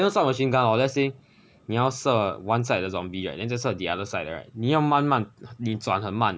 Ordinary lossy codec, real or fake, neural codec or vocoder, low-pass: none; real; none; none